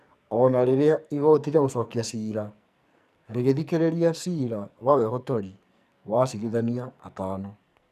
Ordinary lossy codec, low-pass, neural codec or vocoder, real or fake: none; 14.4 kHz; codec, 44.1 kHz, 2.6 kbps, SNAC; fake